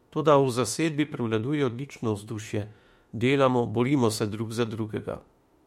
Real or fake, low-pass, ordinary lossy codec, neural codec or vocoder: fake; 19.8 kHz; MP3, 64 kbps; autoencoder, 48 kHz, 32 numbers a frame, DAC-VAE, trained on Japanese speech